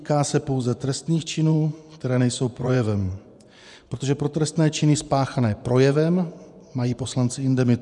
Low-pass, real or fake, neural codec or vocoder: 10.8 kHz; fake; vocoder, 24 kHz, 100 mel bands, Vocos